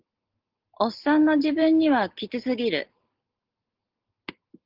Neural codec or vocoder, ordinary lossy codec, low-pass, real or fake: none; Opus, 16 kbps; 5.4 kHz; real